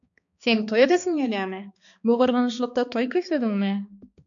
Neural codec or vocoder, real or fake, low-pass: codec, 16 kHz, 2 kbps, X-Codec, HuBERT features, trained on balanced general audio; fake; 7.2 kHz